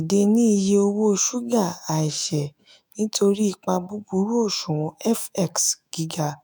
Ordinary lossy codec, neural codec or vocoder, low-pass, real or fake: none; autoencoder, 48 kHz, 128 numbers a frame, DAC-VAE, trained on Japanese speech; none; fake